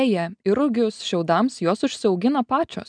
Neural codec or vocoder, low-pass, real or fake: none; 9.9 kHz; real